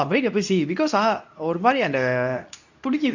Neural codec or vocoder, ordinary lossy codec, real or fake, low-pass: codec, 24 kHz, 0.9 kbps, WavTokenizer, medium speech release version 2; none; fake; 7.2 kHz